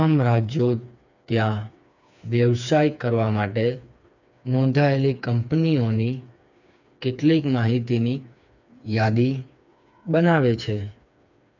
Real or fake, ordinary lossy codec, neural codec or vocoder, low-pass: fake; none; codec, 16 kHz, 4 kbps, FreqCodec, smaller model; 7.2 kHz